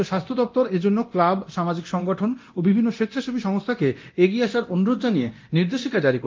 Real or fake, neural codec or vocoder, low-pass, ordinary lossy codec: fake; codec, 24 kHz, 0.9 kbps, DualCodec; 7.2 kHz; Opus, 24 kbps